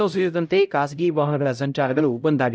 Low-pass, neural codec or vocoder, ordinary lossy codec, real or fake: none; codec, 16 kHz, 0.5 kbps, X-Codec, HuBERT features, trained on LibriSpeech; none; fake